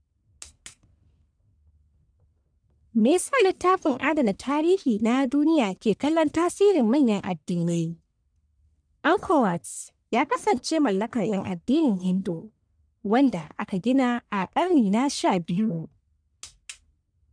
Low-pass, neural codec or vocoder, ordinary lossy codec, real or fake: 9.9 kHz; codec, 44.1 kHz, 1.7 kbps, Pupu-Codec; none; fake